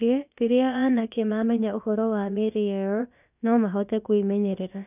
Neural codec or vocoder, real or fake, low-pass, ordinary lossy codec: codec, 16 kHz, about 1 kbps, DyCAST, with the encoder's durations; fake; 3.6 kHz; none